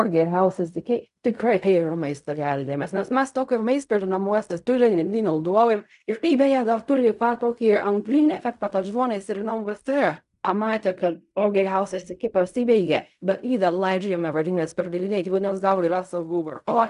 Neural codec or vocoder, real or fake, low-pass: codec, 16 kHz in and 24 kHz out, 0.4 kbps, LongCat-Audio-Codec, fine tuned four codebook decoder; fake; 10.8 kHz